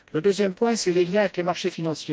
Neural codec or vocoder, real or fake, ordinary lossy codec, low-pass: codec, 16 kHz, 1 kbps, FreqCodec, smaller model; fake; none; none